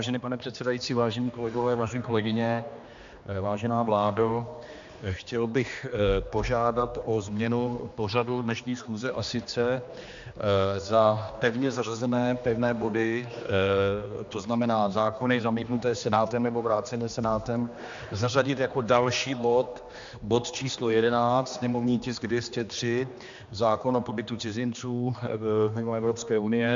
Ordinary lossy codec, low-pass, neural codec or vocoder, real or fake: MP3, 64 kbps; 7.2 kHz; codec, 16 kHz, 2 kbps, X-Codec, HuBERT features, trained on general audio; fake